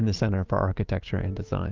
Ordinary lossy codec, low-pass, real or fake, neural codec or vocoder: Opus, 24 kbps; 7.2 kHz; real; none